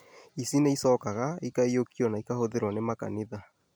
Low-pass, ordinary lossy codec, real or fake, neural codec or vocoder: none; none; real; none